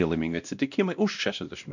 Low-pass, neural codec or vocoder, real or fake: 7.2 kHz; codec, 16 kHz, 1 kbps, X-Codec, WavLM features, trained on Multilingual LibriSpeech; fake